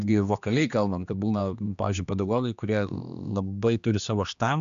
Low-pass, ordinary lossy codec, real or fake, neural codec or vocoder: 7.2 kHz; AAC, 96 kbps; fake; codec, 16 kHz, 2 kbps, X-Codec, HuBERT features, trained on general audio